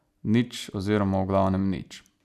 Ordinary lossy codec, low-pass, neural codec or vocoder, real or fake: none; 14.4 kHz; none; real